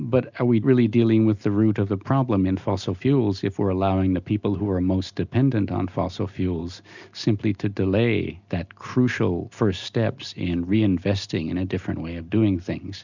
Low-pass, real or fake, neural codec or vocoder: 7.2 kHz; real; none